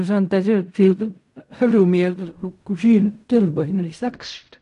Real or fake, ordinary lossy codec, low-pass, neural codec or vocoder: fake; none; 10.8 kHz; codec, 16 kHz in and 24 kHz out, 0.4 kbps, LongCat-Audio-Codec, fine tuned four codebook decoder